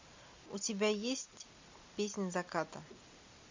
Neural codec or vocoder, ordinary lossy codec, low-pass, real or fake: none; MP3, 64 kbps; 7.2 kHz; real